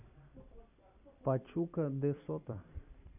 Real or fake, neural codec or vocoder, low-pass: fake; vocoder, 44.1 kHz, 128 mel bands every 256 samples, BigVGAN v2; 3.6 kHz